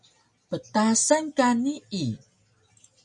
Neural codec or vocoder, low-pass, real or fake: none; 10.8 kHz; real